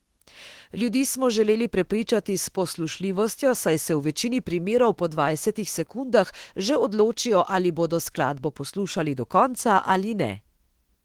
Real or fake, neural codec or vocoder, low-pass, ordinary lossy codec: fake; autoencoder, 48 kHz, 32 numbers a frame, DAC-VAE, trained on Japanese speech; 19.8 kHz; Opus, 16 kbps